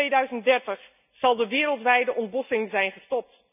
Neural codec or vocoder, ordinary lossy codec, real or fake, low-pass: none; none; real; 3.6 kHz